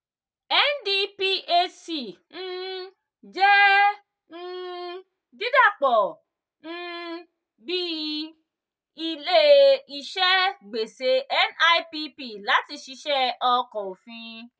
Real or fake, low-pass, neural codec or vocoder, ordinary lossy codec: real; none; none; none